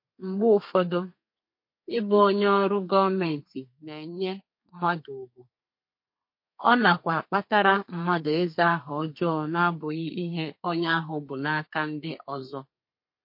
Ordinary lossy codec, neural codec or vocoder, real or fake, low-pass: MP3, 32 kbps; codec, 32 kHz, 1.9 kbps, SNAC; fake; 5.4 kHz